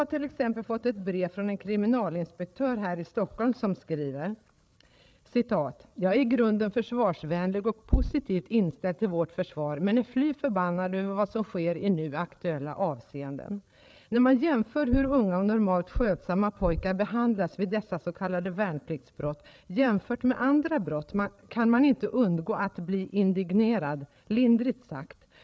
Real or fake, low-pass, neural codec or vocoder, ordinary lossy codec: fake; none; codec, 16 kHz, 8 kbps, FreqCodec, larger model; none